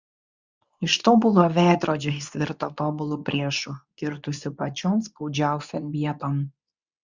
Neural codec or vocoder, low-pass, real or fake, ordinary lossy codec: codec, 24 kHz, 0.9 kbps, WavTokenizer, medium speech release version 2; 7.2 kHz; fake; Opus, 64 kbps